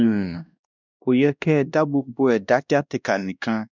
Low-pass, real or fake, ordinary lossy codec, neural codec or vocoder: 7.2 kHz; fake; none; codec, 16 kHz, 1 kbps, X-Codec, WavLM features, trained on Multilingual LibriSpeech